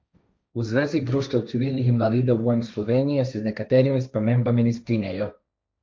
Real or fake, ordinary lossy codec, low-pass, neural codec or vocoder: fake; none; none; codec, 16 kHz, 1.1 kbps, Voila-Tokenizer